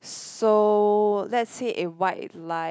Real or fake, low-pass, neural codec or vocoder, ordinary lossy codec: real; none; none; none